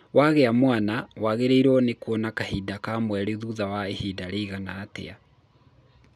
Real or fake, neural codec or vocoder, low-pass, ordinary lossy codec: real; none; 14.4 kHz; none